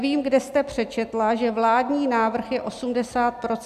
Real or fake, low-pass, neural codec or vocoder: real; 14.4 kHz; none